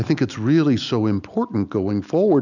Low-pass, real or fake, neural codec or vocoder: 7.2 kHz; real; none